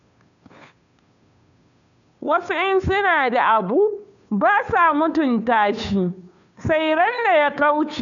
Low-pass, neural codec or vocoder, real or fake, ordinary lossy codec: 7.2 kHz; codec, 16 kHz, 2 kbps, FunCodec, trained on Chinese and English, 25 frames a second; fake; none